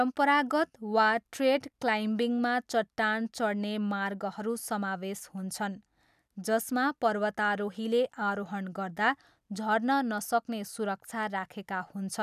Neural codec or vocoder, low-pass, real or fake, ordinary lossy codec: none; 14.4 kHz; real; none